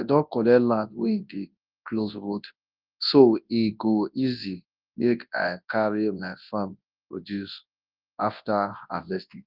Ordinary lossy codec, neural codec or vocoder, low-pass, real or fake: Opus, 32 kbps; codec, 24 kHz, 0.9 kbps, WavTokenizer, large speech release; 5.4 kHz; fake